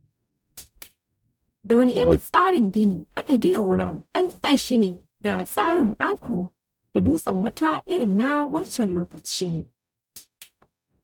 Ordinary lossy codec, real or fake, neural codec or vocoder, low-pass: none; fake; codec, 44.1 kHz, 0.9 kbps, DAC; 19.8 kHz